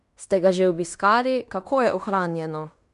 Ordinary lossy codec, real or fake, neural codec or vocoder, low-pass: none; fake; codec, 16 kHz in and 24 kHz out, 0.9 kbps, LongCat-Audio-Codec, fine tuned four codebook decoder; 10.8 kHz